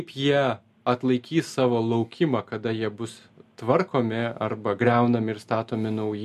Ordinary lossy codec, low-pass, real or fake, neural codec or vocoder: MP3, 64 kbps; 14.4 kHz; real; none